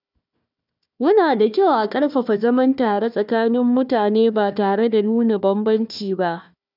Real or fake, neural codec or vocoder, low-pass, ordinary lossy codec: fake; codec, 16 kHz, 1 kbps, FunCodec, trained on Chinese and English, 50 frames a second; 5.4 kHz; none